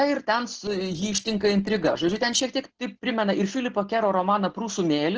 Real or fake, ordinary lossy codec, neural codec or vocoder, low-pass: real; Opus, 16 kbps; none; 7.2 kHz